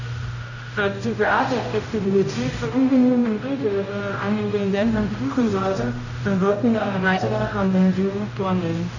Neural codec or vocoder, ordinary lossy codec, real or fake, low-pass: codec, 16 kHz, 0.5 kbps, X-Codec, HuBERT features, trained on general audio; none; fake; 7.2 kHz